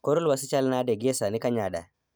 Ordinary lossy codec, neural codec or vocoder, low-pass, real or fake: none; none; none; real